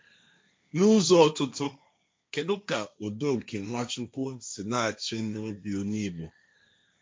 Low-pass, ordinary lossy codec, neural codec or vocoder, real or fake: none; none; codec, 16 kHz, 1.1 kbps, Voila-Tokenizer; fake